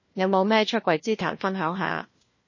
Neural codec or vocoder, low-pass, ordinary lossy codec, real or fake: codec, 16 kHz, 1 kbps, FunCodec, trained on LibriTTS, 50 frames a second; 7.2 kHz; MP3, 32 kbps; fake